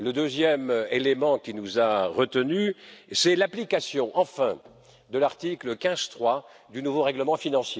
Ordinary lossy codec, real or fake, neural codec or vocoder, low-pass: none; real; none; none